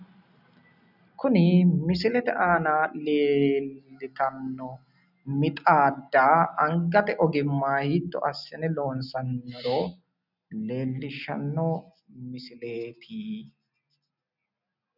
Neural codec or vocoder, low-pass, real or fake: none; 5.4 kHz; real